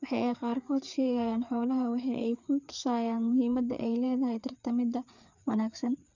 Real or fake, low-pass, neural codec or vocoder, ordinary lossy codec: fake; 7.2 kHz; codec, 16 kHz, 4 kbps, FreqCodec, larger model; none